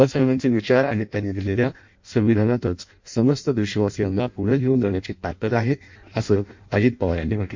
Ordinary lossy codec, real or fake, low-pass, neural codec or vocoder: MP3, 48 kbps; fake; 7.2 kHz; codec, 16 kHz in and 24 kHz out, 0.6 kbps, FireRedTTS-2 codec